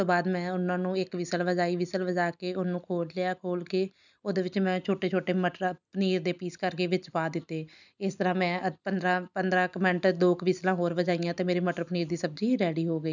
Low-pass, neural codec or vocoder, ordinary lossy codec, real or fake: 7.2 kHz; none; none; real